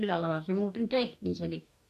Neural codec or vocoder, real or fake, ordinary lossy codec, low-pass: codec, 44.1 kHz, 2.6 kbps, DAC; fake; none; 14.4 kHz